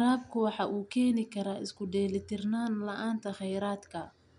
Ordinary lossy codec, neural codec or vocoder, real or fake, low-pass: none; none; real; 10.8 kHz